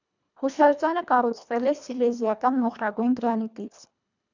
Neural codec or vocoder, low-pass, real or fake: codec, 24 kHz, 1.5 kbps, HILCodec; 7.2 kHz; fake